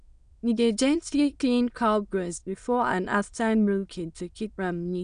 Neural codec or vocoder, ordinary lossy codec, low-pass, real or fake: autoencoder, 22.05 kHz, a latent of 192 numbers a frame, VITS, trained on many speakers; none; 9.9 kHz; fake